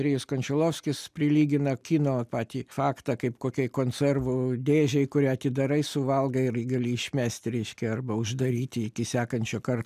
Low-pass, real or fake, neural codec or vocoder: 14.4 kHz; real; none